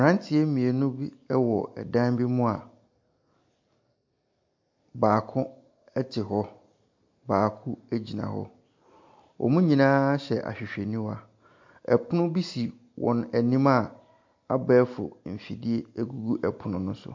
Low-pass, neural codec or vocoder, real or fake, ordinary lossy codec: 7.2 kHz; none; real; MP3, 48 kbps